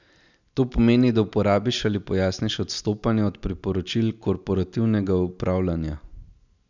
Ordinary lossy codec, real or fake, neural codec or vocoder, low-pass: none; real; none; 7.2 kHz